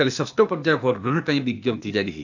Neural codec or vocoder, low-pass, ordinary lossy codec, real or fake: codec, 16 kHz, 0.8 kbps, ZipCodec; 7.2 kHz; none; fake